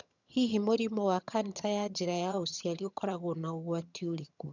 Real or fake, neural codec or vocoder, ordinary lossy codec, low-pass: fake; vocoder, 22.05 kHz, 80 mel bands, HiFi-GAN; none; 7.2 kHz